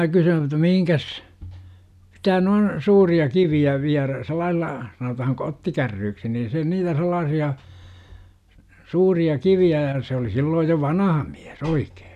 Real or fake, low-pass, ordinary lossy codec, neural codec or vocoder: real; 14.4 kHz; none; none